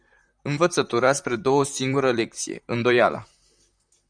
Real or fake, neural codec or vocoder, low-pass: fake; vocoder, 44.1 kHz, 128 mel bands, Pupu-Vocoder; 9.9 kHz